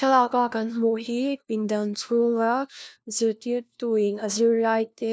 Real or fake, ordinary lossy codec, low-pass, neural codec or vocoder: fake; none; none; codec, 16 kHz, 1 kbps, FunCodec, trained on LibriTTS, 50 frames a second